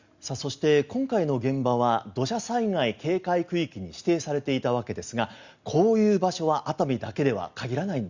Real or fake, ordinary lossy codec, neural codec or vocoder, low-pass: real; Opus, 64 kbps; none; 7.2 kHz